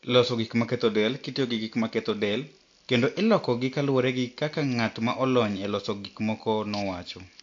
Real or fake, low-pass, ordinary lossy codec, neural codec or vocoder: real; 7.2 kHz; AAC, 48 kbps; none